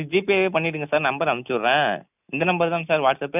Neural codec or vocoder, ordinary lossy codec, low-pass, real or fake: none; none; 3.6 kHz; real